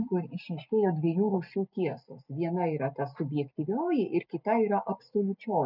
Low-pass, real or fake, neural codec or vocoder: 5.4 kHz; real; none